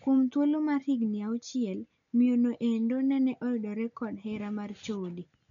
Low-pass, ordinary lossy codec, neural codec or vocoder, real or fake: 7.2 kHz; none; none; real